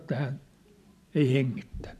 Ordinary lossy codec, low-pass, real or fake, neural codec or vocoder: none; 14.4 kHz; real; none